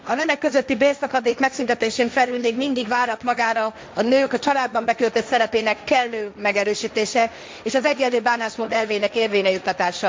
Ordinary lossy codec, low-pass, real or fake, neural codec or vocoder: none; none; fake; codec, 16 kHz, 1.1 kbps, Voila-Tokenizer